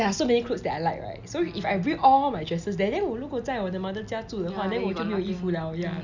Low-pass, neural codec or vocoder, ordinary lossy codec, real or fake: 7.2 kHz; none; none; real